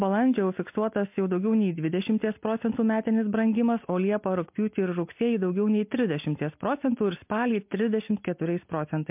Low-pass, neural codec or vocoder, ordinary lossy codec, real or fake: 3.6 kHz; none; MP3, 32 kbps; real